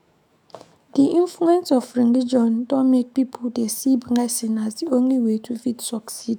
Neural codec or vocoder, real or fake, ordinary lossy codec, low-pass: autoencoder, 48 kHz, 128 numbers a frame, DAC-VAE, trained on Japanese speech; fake; none; none